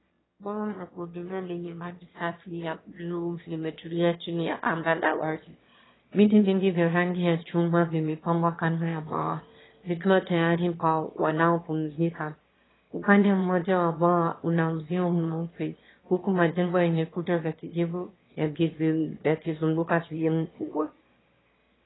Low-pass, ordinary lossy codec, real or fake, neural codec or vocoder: 7.2 kHz; AAC, 16 kbps; fake; autoencoder, 22.05 kHz, a latent of 192 numbers a frame, VITS, trained on one speaker